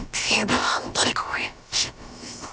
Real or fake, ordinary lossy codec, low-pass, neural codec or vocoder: fake; none; none; codec, 16 kHz, about 1 kbps, DyCAST, with the encoder's durations